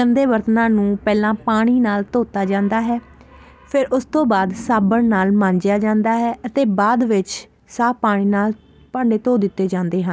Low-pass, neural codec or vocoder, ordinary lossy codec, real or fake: none; none; none; real